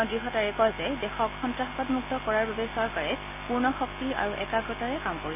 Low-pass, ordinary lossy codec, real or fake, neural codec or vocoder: 3.6 kHz; none; real; none